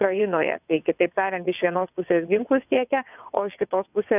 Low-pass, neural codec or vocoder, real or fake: 3.6 kHz; vocoder, 22.05 kHz, 80 mel bands, WaveNeXt; fake